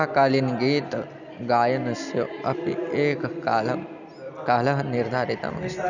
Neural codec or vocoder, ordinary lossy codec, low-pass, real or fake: none; none; 7.2 kHz; real